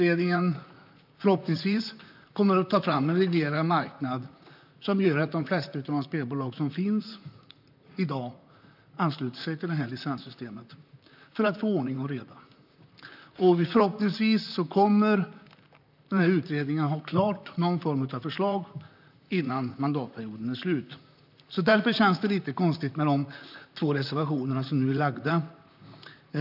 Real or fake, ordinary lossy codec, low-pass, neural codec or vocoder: fake; none; 5.4 kHz; vocoder, 44.1 kHz, 128 mel bands, Pupu-Vocoder